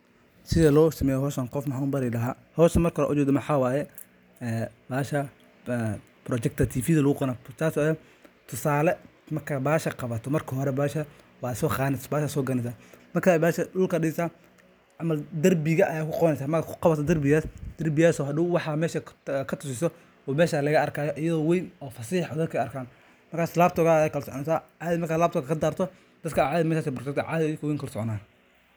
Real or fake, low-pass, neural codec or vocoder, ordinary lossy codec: real; none; none; none